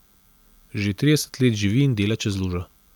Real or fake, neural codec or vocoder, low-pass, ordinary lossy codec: real; none; 19.8 kHz; none